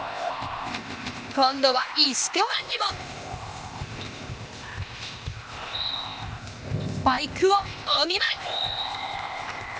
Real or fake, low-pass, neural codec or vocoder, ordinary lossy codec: fake; none; codec, 16 kHz, 0.8 kbps, ZipCodec; none